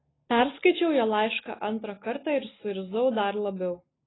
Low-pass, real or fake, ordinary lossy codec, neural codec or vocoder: 7.2 kHz; real; AAC, 16 kbps; none